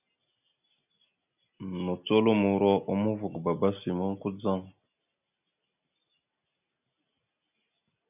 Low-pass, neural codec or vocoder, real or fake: 3.6 kHz; none; real